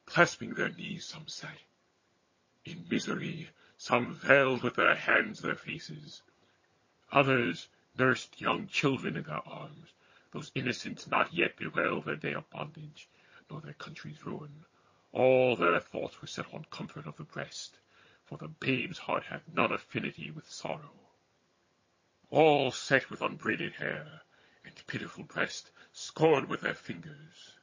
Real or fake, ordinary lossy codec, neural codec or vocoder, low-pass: fake; MP3, 32 kbps; vocoder, 22.05 kHz, 80 mel bands, HiFi-GAN; 7.2 kHz